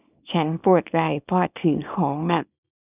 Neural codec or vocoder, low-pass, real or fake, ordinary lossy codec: codec, 24 kHz, 0.9 kbps, WavTokenizer, small release; 3.6 kHz; fake; none